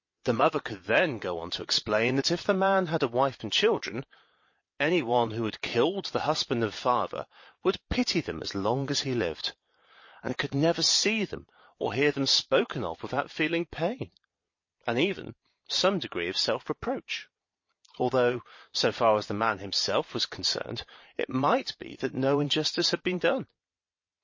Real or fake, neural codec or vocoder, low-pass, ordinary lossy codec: fake; vocoder, 22.05 kHz, 80 mel bands, Vocos; 7.2 kHz; MP3, 32 kbps